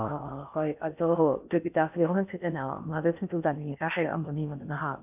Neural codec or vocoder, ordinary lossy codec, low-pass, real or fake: codec, 16 kHz in and 24 kHz out, 0.6 kbps, FocalCodec, streaming, 4096 codes; none; 3.6 kHz; fake